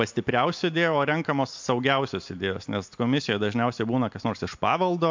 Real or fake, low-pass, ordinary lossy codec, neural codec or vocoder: real; 7.2 kHz; MP3, 64 kbps; none